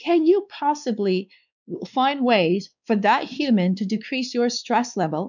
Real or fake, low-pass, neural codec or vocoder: fake; 7.2 kHz; codec, 16 kHz, 2 kbps, X-Codec, WavLM features, trained on Multilingual LibriSpeech